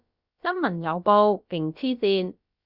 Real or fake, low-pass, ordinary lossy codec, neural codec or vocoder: fake; 5.4 kHz; Opus, 64 kbps; codec, 16 kHz, about 1 kbps, DyCAST, with the encoder's durations